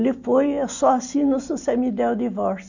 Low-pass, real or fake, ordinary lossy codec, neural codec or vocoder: 7.2 kHz; real; none; none